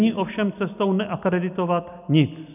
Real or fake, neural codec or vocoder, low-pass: real; none; 3.6 kHz